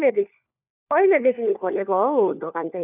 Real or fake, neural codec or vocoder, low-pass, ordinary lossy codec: fake; codec, 16 kHz, 4 kbps, FunCodec, trained on LibriTTS, 50 frames a second; 3.6 kHz; none